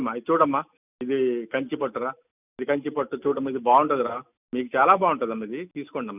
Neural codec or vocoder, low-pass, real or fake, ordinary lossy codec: none; 3.6 kHz; real; none